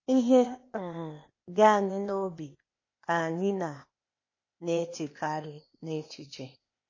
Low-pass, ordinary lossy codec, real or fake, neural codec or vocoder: 7.2 kHz; MP3, 32 kbps; fake; codec, 16 kHz, 0.8 kbps, ZipCodec